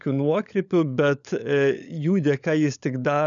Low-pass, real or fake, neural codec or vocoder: 7.2 kHz; fake; codec, 16 kHz, 16 kbps, FunCodec, trained on LibriTTS, 50 frames a second